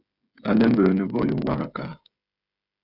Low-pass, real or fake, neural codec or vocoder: 5.4 kHz; fake; codec, 16 kHz, 16 kbps, FreqCodec, smaller model